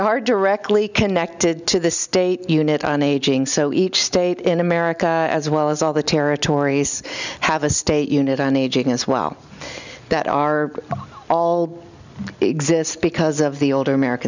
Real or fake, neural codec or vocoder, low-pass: real; none; 7.2 kHz